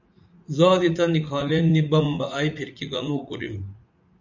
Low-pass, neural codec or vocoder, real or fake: 7.2 kHz; vocoder, 44.1 kHz, 80 mel bands, Vocos; fake